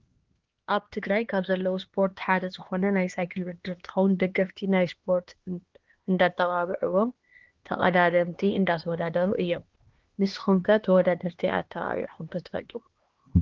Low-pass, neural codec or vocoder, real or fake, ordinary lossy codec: 7.2 kHz; codec, 16 kHz, 2 kbps, X-Codec, HuBERT features, trained on LibriSpeech; fake; Opus, 16 kbps